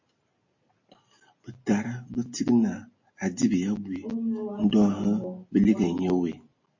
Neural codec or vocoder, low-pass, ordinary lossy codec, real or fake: none; 7.2 kHz; MP3, 32 kbps; real